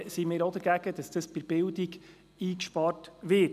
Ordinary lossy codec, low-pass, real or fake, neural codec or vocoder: none; 14.4 kHz; real; none